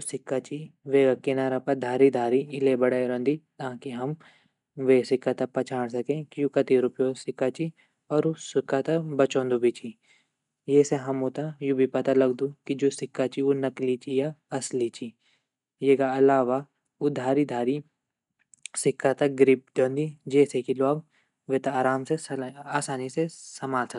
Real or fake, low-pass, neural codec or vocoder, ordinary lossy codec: real; 10.8 kHz; none; none